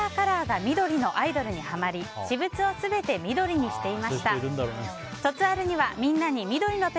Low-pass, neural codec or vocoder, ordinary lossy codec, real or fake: none; none; none; real